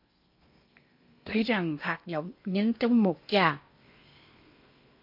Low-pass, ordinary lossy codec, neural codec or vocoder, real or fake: 5.4 kHz; MP3, 32 kbps; codec, 16 kHz in and 24 kHz out, 0.8 kbps, FocalCodec, streaming, 65536 codes; fake